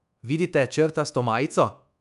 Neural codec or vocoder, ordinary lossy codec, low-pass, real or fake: codec, 24 kHz, 1.2 kbps, DualCodec; none; 10.8 kHz; fake